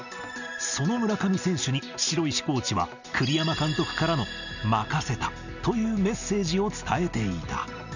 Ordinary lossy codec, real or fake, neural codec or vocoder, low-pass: none; real; none; 7.2 kHz